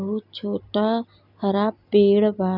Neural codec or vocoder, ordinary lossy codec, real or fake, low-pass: none; none; real; 5.4 kHz